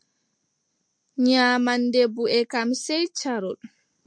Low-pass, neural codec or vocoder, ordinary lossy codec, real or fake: 9.9 kHz; none; MP3, 64 kbps; real